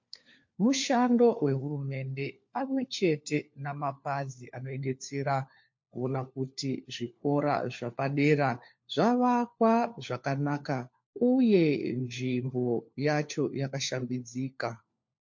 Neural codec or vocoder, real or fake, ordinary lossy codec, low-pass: codec, 16 kHz, 4 kbps, FunCodec, trained on LibriTTS, 50 frames a second; fake; MP3, 48 kbps; 7.2 kHz